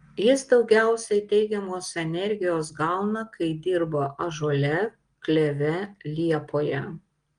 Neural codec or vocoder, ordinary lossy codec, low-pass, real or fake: none; Opus, 24 kbps; 9.9 kHz; real